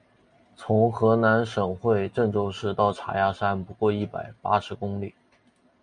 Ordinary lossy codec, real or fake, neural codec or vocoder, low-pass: MP3, 96 kbps; real; none; 9.9 kHz